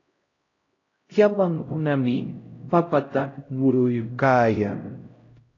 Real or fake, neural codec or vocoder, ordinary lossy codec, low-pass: fake; codec, 16 kHz, 0.5 kbps, X-Codec, HuBERT features, trained on LibriSpeech; AAC, 32 kbps; 7.2 kHz